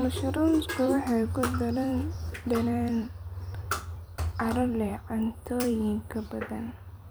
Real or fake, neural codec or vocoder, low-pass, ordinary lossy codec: fake; vocoder, 44.1 kHz, 128 mel bands every 256 samples, BigVGAN v2; none; none